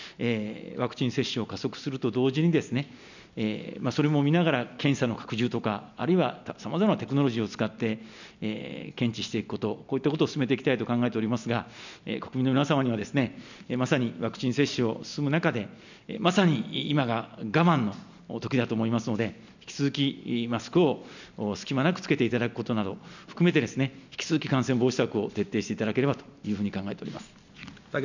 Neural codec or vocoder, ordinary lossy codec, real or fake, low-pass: none; none; real; 7.2 kHz